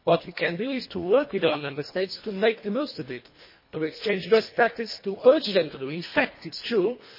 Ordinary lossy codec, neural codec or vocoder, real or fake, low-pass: MP3, 24 kbps; codec, 24 kHz, 1.5 kbps, HILCodec; fake; 5.4 kHz